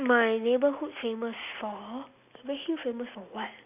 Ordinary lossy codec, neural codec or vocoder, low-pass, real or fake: none; none; 3.6 kHz; real